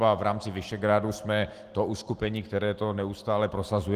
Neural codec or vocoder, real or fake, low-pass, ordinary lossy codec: none; real; 14.4 kHz; Opus, 24 kbps